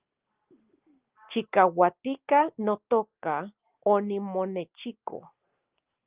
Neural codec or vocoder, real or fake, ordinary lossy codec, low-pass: none; real; Opus, 32 kbps; 3.6 kHz